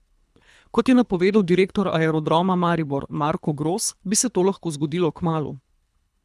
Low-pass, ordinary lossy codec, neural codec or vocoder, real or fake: none; none; codec, 24 kHz, 3 kbps, HILCodec; fake